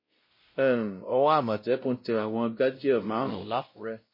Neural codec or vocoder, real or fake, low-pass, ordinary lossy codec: codec, 16 kHz, 0.5 kbps, X-Codec, WavLM features, trained on Multilingual LibriSpeech; fake; 5.4 kHz; MP3, 24 kbps